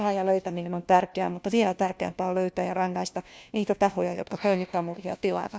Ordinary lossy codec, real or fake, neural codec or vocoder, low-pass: none; fake; codec, 16 kHz, 1 kbps, FunCodec, trained on LibriTTS, 50 frames a second; none